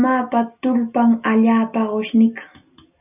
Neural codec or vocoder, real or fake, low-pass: none; real; 3.6 kHz